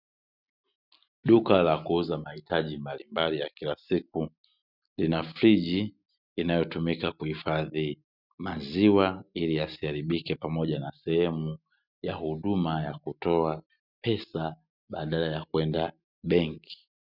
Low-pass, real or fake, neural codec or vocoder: 5.4 kHz; real; none